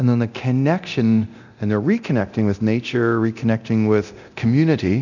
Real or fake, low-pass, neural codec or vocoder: fake; 7.2 kHz; codec, 24 kHz, 0.9 kbps, DualCodec